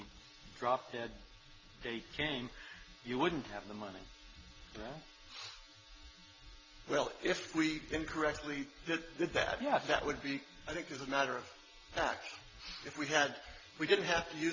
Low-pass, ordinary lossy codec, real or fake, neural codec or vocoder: 7.2 kHz; Opus, 32 kbps; real; none